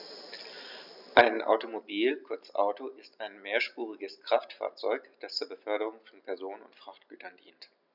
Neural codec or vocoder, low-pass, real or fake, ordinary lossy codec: none; 5.4 kHz; real; none